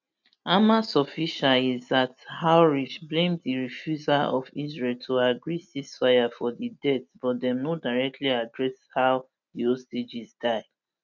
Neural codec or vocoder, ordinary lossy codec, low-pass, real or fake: none; none; 7.2 kHz; real